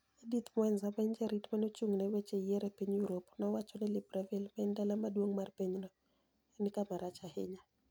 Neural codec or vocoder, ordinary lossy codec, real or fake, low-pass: none; none; real; none